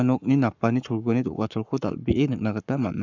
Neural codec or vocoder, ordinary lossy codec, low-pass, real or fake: codec, 44.1 kHz, 7.8 kbps, Pupu-Codec; none; 7.2 kHz; fake